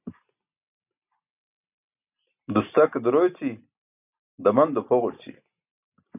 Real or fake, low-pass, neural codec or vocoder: real; 3.6 kHz; none